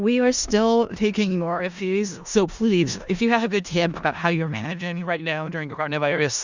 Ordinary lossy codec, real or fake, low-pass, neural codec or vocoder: Opus, 64 kbps; fake; 7.2 kHz; codec, 16 kHz in and 24 kHz out, 0.4 kbps, LongCat-Audio-Codec, four codebook decoder